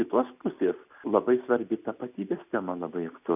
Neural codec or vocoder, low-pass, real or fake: none; 3.6 kHz; real